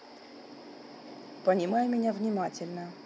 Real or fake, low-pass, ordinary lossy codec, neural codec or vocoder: real; none; none; none